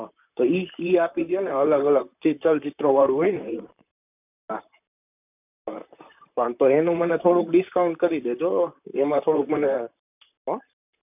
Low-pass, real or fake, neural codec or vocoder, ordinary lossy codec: 3.6 kHz; fake; vocoder, 44.1 kHz, 128 mel bands, Pupu-Vocoder; none